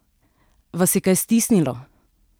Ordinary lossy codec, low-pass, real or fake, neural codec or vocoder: none; none; real; none